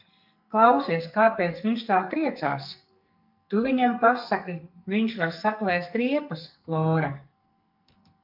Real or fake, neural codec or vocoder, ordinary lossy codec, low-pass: fake; codec, 44.1 kHz, 2.6 kbps, SNAC; MP3, 48 kbps; 5.4 kHz